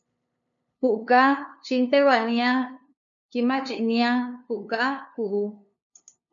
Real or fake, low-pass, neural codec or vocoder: fake; 7.2 kHz; codec, 16 kHz, 2 kbps, FunCodec, trained on LibriTTS, 25 frames a second